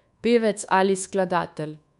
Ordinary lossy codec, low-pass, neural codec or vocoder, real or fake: none; 10.8 kHz; codec, 24 kHz, 1.2 kbps, DualCodec; fake